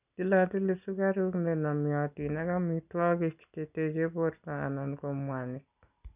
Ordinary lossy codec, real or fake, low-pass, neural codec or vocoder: none; real; 3.6 kHz; none